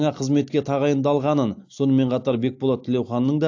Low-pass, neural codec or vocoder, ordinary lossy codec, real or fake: 7.2 kHz; none; AAC, 48 kbps; real